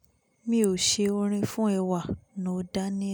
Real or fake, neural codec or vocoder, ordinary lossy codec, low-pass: real; none; none; none